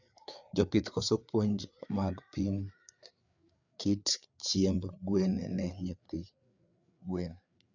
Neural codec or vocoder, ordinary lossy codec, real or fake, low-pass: codec, 16 kHz, 4 kbps, FreqCodec, larger model; none; fake; 7.2 kHz